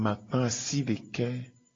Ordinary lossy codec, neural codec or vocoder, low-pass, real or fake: AAC, 32 kbps; none; 7.2 kHz; real